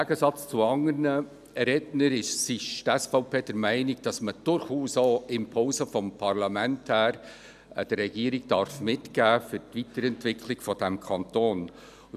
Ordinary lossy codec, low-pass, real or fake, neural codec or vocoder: none; 14.4 kHz; real; none